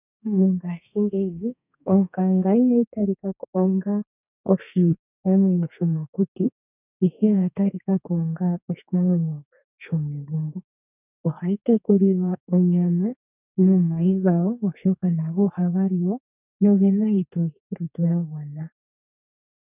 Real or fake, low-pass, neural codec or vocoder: fake; 3.6 kHz; codec, 32 kHz, 1.9 kbps, SNAC